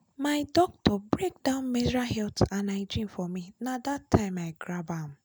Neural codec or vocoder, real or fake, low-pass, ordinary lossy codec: none; real; none; none